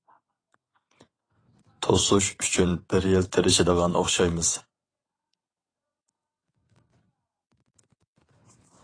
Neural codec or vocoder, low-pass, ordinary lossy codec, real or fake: vocoder, 22.05 kHz, 80 mel bands, WaveNeXt; 9.9 kHz; AAC, 32 kbps; fake